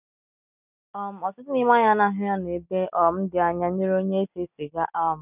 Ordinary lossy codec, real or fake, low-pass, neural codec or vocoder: none; real; 3.6 kHz; none